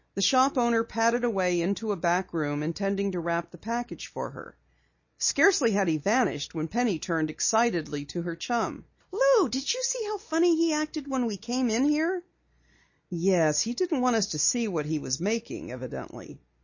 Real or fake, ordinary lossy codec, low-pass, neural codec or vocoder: real; MP3, 32 kbps; 7.2 kHz; none